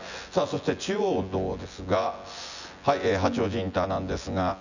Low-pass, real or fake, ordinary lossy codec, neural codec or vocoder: 7.2 kHz; fake; none; vocoder, 24 kHz, 100 mel bands, Vocos